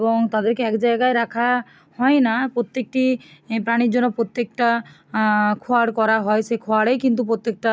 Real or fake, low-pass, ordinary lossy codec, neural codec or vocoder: real; none; none; none